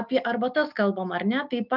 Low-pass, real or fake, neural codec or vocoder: 5.4 kHz; real; none